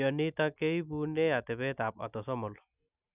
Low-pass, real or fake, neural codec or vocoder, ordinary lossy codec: 3.6 kHz; real; none; none